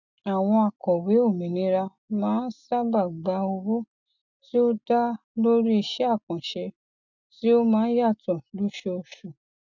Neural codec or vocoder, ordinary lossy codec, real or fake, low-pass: none; none; real; 7.2 kHz